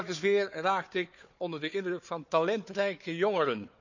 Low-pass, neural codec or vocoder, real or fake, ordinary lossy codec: 7.2 kHz; codec, 16 kHz, 4 kbps, FunCodec, trained on Chinese and English, 50 frames a second; fake; none